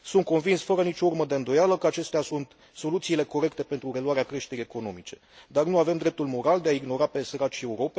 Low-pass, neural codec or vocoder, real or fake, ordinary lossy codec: none; none; real; none